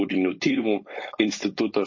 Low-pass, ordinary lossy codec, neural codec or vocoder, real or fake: 7.2 kHz; MP3, 32 kbps; codec, 16 kHz, 4.8 kbps, FACodec; fake